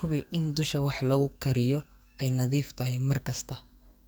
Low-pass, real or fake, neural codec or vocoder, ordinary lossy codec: none; fake; codec, 44.1 kHz, 2.6 kbps, SNAC; none